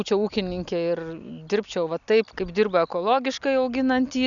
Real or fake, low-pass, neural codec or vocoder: real; 7.2 kHz; none